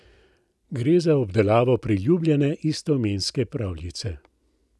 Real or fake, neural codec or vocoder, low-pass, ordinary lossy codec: real; none; none; none